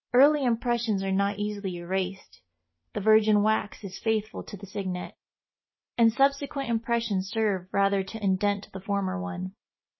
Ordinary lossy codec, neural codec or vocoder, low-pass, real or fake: MP3, 24 kbps; none; 7.2 kHz; real